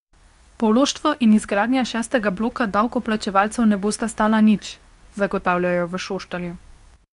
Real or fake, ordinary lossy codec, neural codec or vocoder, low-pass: fake; none; codec, 24 kHz, 0.9 kbps, WavTokenizer, medium speech release version 2; 10.8 kHz